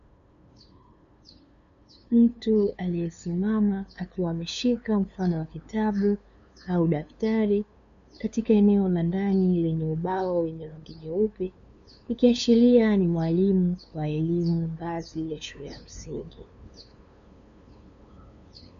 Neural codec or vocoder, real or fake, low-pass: codec, 16 kHz, 2 kbps, FunCodec, trained on LibriTTS, 25 frames a second; fake; 7.2 kHz